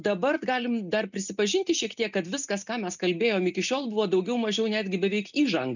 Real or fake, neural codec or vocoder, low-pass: real; none; 7.2 kHz